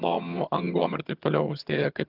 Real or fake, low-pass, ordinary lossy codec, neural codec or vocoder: fake; 5.4 kHz; Opus, 24 kbps; vocoder, 22.05 kHz, 80 mel bands, HiFi-GAN